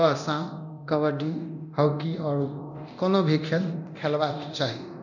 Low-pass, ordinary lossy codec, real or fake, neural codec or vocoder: 7.2 kHz; none; fake; codec, 24 kHz, 0.9 kbps, DualCodec